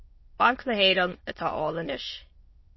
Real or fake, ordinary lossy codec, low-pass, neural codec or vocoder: fake; MP3, 24 kbps; 7.2 kHz; autoencoder, 22.05 kHz, a latent of 192 numbers a frame, VITS, trained on many speakers